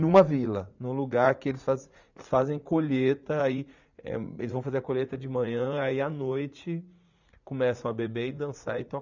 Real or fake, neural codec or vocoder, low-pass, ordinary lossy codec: fake; vocoder, 44.1 kHz, 80 mel bands, Vocos; 7.2 kHz; none